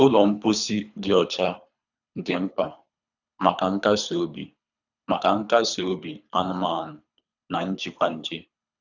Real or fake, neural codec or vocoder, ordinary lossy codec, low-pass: fake; codec, 24 kHz, 3 kbps, HILCodec; none; 7.2 kHz